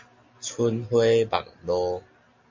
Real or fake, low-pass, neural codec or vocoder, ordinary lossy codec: real; 7.2 kHz; none; MP3, 48 kbps